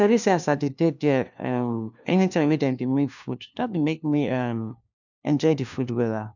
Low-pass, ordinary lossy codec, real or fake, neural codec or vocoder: 7.2 kHz; none; fake; codec, 16 kHz, 1 kbps, FunCodec, trained on LibriTTS, 50 frames a second